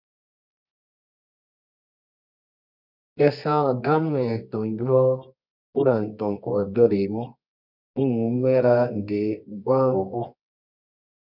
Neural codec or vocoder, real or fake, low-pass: codec, 24 kHz, 0.9 kbps, WavTokenizer, medium music audio release; fake; 5.4 kHz